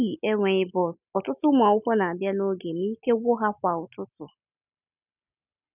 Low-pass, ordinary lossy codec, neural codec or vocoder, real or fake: 3.6 kHz; none; none; real